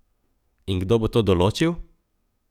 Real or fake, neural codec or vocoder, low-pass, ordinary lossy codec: fake; codec, 44.1 kHz, 7.8 kbps, DAC; 19.8 kHz; none